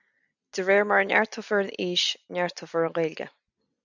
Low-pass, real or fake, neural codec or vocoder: 7.2 kHz; real; none